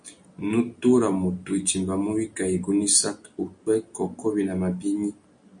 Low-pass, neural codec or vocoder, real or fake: 9.9 kHz; none; real